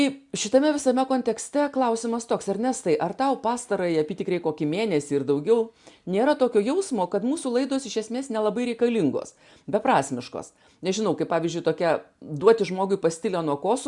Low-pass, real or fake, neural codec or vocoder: 10.8 kHz; real; none